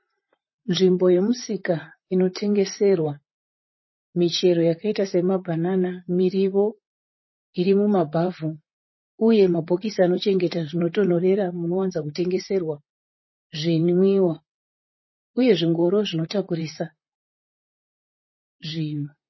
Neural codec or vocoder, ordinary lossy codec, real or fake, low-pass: vocoder, 44.1 kHz, 128 mel bands, Pupu-Vocoder; MP3, 24 kbps; fake; 7.2 kHz